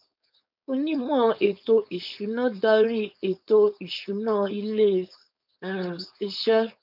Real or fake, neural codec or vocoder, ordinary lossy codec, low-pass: fake; codec, 16 kHz, 4.8 kbps, FACodec; none; 5.4 kHz